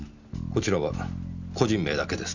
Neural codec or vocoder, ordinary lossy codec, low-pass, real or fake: none; none; 7.2 kHz; real